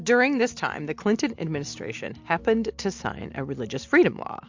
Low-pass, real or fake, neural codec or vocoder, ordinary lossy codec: 7.2 kHz; real; none; MP3, 64 kbps